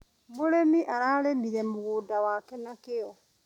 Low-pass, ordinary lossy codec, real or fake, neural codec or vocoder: 19.8 kHz; none; real; none